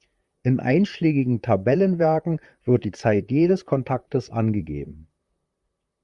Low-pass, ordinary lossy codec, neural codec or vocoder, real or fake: 10.8 kHz; Opus, 64 kbps; vocoder, 44.1 kHz, 128 mel bands, Pupu-Vocoder; fake